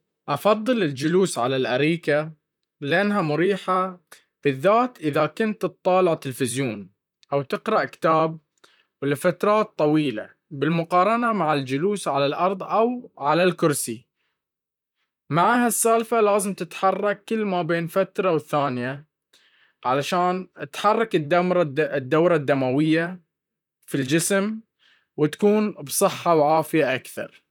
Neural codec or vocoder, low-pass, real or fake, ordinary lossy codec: vocoder, 44.1 kHz, 128 mel bands, Pupu-Vocoder; 19.8 kHz; fake; none